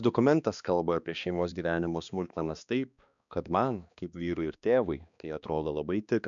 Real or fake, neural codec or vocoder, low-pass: fake; codec, 16 kHz, 2 kbps, X-Codec, HuBERT features, trained on balanced general audio; 7.2 kHz